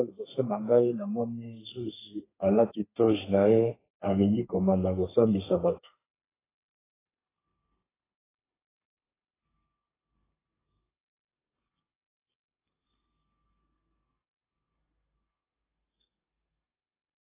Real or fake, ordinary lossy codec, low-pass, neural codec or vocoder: fake; AAC, 16 kbps; 3.6 kHz; codec, 32 kHz, 1.9 kbps, SNAC